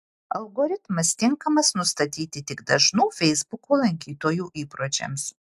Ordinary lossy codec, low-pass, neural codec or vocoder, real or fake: AAC, 96 kbps; 14.4 kHz; none; real